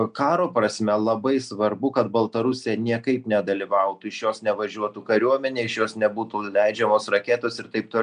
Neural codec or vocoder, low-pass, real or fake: none; 9.9 kHz; real